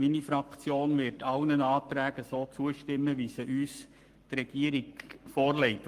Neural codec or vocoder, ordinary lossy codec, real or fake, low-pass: codec, 44.1 kHz, 7.8 kbps, Pupu-Codec; Opus, 32 kbps; fake; 14.4 kHz